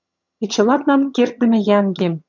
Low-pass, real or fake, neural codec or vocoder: 7.2 kHz; fake; vocoder, 22.05 kHz, 80 mel bands, HiFi-GAN